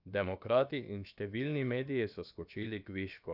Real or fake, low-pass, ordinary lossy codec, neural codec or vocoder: fake; 5.4 kHz; none; codec, 16 kHz, about 1 kbps, DyCAST, with the encoder's durations